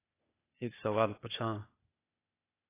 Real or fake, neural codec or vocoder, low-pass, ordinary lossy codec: fake; codec, 16 kHz, 0.8 kbps, ZipCodec; 3.6 kHz; AAC, 24 kbps